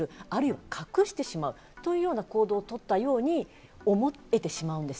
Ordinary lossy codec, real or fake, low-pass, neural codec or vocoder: none; real; none; none